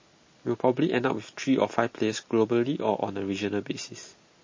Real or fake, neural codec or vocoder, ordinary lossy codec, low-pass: real; none; MP3, 32 kbps; 7.2 kHz